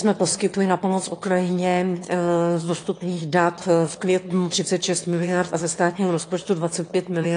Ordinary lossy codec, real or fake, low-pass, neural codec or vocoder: AAC, 48 kbps; fake; 9.9 kHz; autoencoder, 22.05 kHz, a latent of 192 numbers a frame, VITS, trained on one speaker